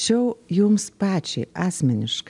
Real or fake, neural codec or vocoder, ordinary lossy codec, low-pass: real; none; MP3, 96 kbps; 10.8 kHz